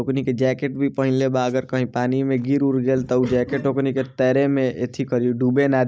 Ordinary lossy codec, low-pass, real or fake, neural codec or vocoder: none; none; real; none